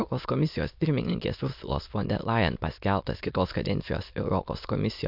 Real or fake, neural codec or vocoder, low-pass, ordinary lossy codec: fake; autoencoder, 22.05 kHz, a latent of 192 numbers a frame, VITS, trained on many speakers; 5.4 kHz; MP3, 48 kbps